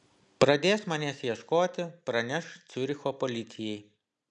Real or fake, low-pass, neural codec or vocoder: real; 9.9 kHz; none